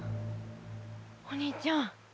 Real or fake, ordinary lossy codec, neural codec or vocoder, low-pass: real; none; none; none